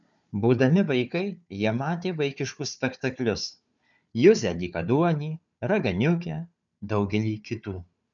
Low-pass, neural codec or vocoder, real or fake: 7.2 kHz; codec, 16 kHz, 4 kbps, FunCodec, trained on Chinese and English, 50 frames a second; fake